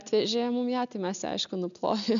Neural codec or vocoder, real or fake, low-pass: none; real; 7.2 kHz